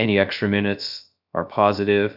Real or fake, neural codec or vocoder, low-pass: fake; codec, 16 kHz, 0.3 kbps, FocalCodec; 5.4 kHz